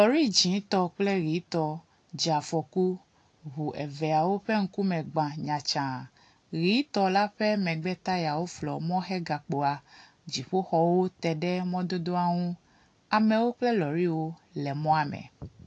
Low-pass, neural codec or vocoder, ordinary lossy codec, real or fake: 9.9 kHz; none; AAC, 48 kbps; real